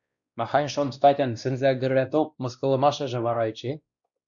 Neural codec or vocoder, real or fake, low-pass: codec, 16 kHz, 1 kbps, X-Codec, WavLM features, trained on Multilingual LibriSpeech; fake; 7.2 kHz